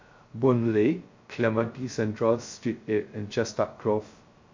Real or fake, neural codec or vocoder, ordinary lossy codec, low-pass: fake; codec, 16 kHz, 0.2 kbps, FocalCodec; MP3, 48 kbps; 7.2 kHz